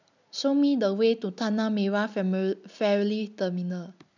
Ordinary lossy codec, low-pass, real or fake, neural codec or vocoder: none; 7.2 kHz; real; none